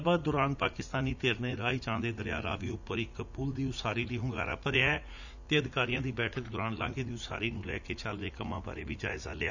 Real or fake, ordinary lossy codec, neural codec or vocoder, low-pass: fake; none; vocoder, 44.1 kHz, 80 mel bands, Vocos; 7.2 kHz